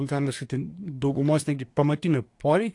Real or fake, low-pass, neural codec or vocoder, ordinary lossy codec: fake; 10.8 kHz; codec, 24 kHz, 1 kbps, SNAC; AAC, 48 kbps